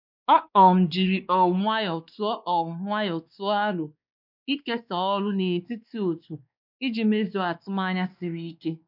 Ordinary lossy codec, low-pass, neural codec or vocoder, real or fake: none; 5.4 kHz; codec, 16 kHz, 2 kbps, X-Codec, WavLM features, trained on Multilingual LibriSpeech; fake